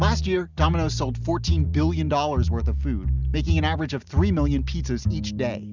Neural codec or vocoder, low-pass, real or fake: none; 7.2 kHz; real